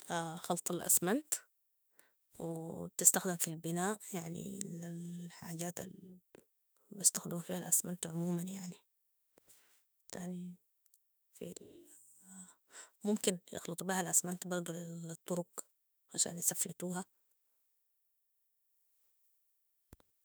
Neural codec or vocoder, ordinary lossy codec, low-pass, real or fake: autoencoder, 48 kHz, 32 numbers a frame, DAC-VAE, trained on Japanese speech; none; none; fake